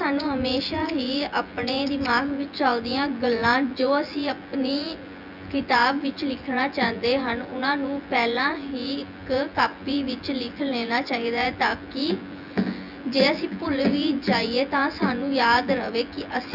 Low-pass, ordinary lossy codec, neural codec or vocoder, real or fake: 5.4 kHz; none; vocoder, 24 kHz, 100 mel bands, Vocos; fake